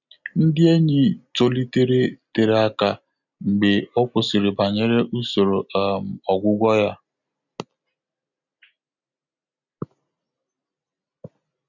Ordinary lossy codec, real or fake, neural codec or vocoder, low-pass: none; real; none; 7.2 kHz